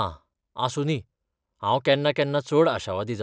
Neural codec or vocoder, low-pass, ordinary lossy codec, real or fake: none; none; none; real